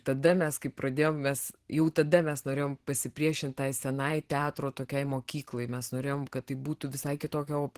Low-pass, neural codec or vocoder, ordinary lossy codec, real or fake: 14.4 kHz; vocoder, 44.1 kHz, 128 mel bands every 512 samples, BigVGAN v2; Opus, 16 kbps; fake